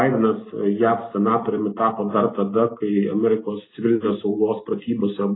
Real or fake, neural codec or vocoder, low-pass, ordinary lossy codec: real; none; 7.2 kHz; AAC, 16 kbps